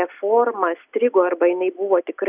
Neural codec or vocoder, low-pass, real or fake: none; 3.6 kHz; real